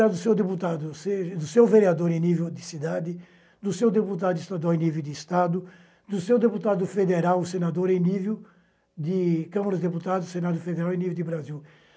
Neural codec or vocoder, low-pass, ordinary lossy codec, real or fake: none; none; none; real